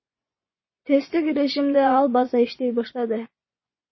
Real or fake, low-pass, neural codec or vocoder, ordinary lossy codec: fake; 7.2 kHz; vocoder, 24 kHz, 100 mel bands, Vocos; MP3, 24 kbps